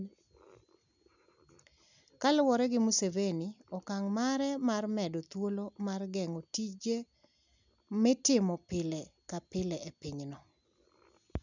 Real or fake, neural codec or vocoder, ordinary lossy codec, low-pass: real; none; none; 7.2 kHz